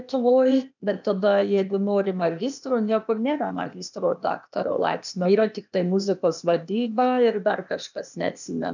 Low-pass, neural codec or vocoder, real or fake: 7.2 kHz; codec, 16 kHz, 0.8 kbps, ZipCodec; fake